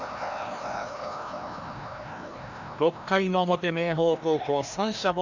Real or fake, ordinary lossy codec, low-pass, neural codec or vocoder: fake; none; 7.2 kHz; codec, 16 kHz, 1 kbps, FreqCodec, larger model